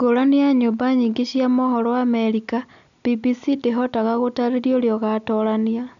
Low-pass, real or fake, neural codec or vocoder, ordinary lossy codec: 7.2 kHz; real; none; none